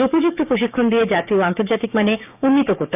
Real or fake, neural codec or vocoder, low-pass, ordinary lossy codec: fake; codec, 16 kHz, 6 kbps, DAC; 3.6 kHz; none